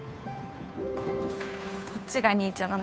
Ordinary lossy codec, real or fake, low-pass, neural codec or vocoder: none; fake; none; codec, 16 kHz, 2 kbps, FunCodec, trained on Chinese and English, 25 frames a second